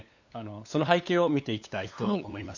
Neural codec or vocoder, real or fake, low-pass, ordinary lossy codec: codec, 16 kHz, 8 kbps, FunCodec, trained on LibriTTS, 25 frames a second; fake; 7.2 kHz; none